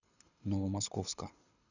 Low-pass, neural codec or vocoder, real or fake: 7.2 kHz; codec, 24 kHz, 6 kbps, HILCodec; fake